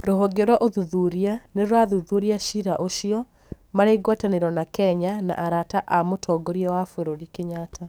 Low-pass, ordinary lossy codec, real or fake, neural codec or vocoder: none; none; fake; codec, 44.1 kHz, 7.8 kbps, DAC